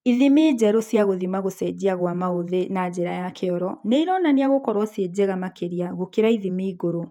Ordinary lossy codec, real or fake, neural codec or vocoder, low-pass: none; fake; vocoder, 48 kHz, 128 mel bands, Vocos; 19.8 kHz